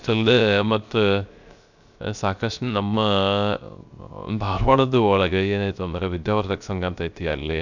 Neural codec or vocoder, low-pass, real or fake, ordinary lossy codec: codec, 16 kHz, 0.3 kbps, FocalCodec; 7.2 kHz; fake; none